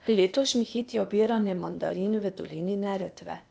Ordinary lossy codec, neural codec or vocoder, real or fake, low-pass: none; codec, 16 kHz, 0.8 kbps, ZipCodec; fake; none